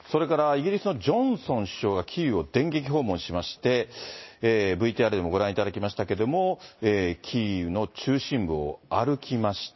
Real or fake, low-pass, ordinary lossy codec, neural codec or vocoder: real; 7.2 kHz; MP3, 24 kbps; none